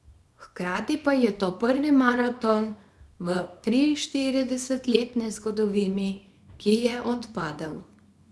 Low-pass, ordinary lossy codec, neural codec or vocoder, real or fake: none; none; codec, 24 kHz, 0.9 kbps, WavTokenizer, small release; fake